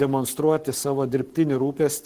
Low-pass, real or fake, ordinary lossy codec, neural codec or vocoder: 14.4 kHz; fake; Opus, 16 kbps; codec, 44.1 kHz, 7.8 kbps, Pupu-Codec